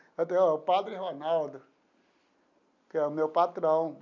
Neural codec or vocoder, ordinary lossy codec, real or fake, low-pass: none; none; real; 7.2 kHz